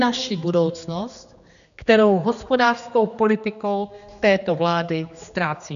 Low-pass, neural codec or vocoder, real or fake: 7.2 kHz; codec, 16 kHz, 2 kbps, X-Codec, HuBERT features, trained on general audio; fake